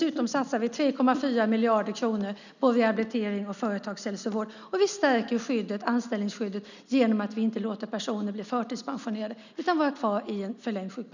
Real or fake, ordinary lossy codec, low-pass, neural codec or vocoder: real; none; 7.2 kHz; none